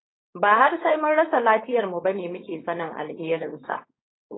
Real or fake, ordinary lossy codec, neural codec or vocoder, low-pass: fake; AAC, 16 kbps; codec, 16 kHz, 4.8 kbps, FACodec; 7.2 kHz